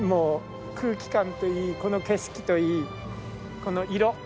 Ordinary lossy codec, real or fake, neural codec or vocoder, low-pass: none; real; none; none